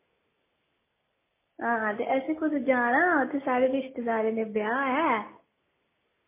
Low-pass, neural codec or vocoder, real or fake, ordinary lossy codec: 3.6 kHz; none; real; MP3, 16 kbps